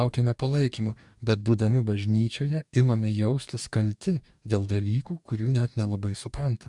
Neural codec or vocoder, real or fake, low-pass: codec, 44.1 kHz, 2.6 kbps, DAC; fake; 10.8 kHz